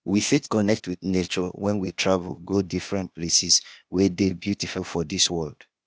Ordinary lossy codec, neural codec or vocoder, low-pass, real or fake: none; codec, 16 kHz, 0.8 kbps, ZipCodec; none; fake